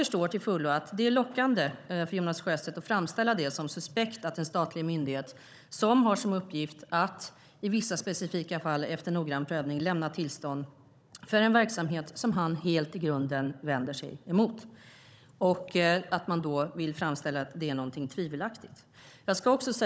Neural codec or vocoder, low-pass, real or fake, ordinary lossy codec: codec, 16 kHz, 16 kbps, FunCodec, trained on Chinese and English, 50 frames a second; none; fake; none